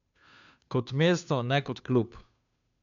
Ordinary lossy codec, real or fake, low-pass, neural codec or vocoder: none; fake; 7.2 kHz; codec, 16 kHz, 2 kbps, FunCodec, trained on Chinese and English, 25 frames a second